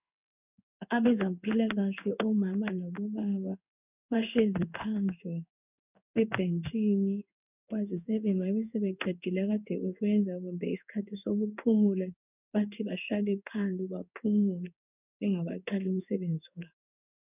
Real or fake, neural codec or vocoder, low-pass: fake; codec, 16 kHz in and 24 kHz out, 1 kbps, XY-Tokenizer; 3.6 kHz